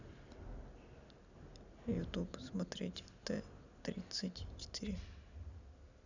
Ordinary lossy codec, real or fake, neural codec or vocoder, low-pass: none; real; none; 7.2 kHz